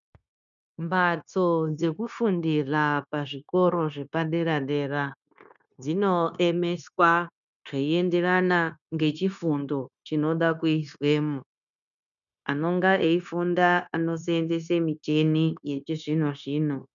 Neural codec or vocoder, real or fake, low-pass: codec, 16 kHz, 0.9 kbps, LongCat-Audio-Codec; fake; 7.2 kHz